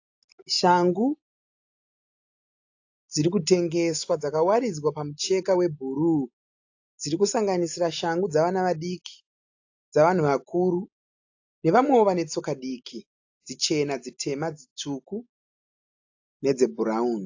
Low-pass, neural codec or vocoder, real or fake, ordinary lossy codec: 7.2 kHz; none; real; AAC, 48 kbps